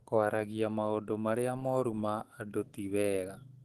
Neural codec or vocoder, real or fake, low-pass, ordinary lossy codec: autoencoder, 48 kHz, 128 numbers a frame, DAC-VAE, trained on Japanese speech; fake; 14.4 kHz; Opus, 16 kbps